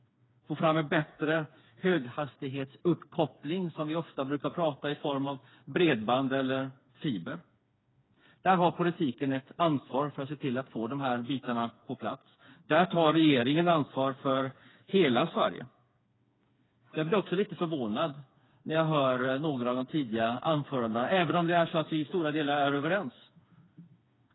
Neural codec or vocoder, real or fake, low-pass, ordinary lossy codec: codec, 16 kHz, 4 kbps, FreqCodec, smaller model; fake; 7.2 kHz; AAC, 16 kbps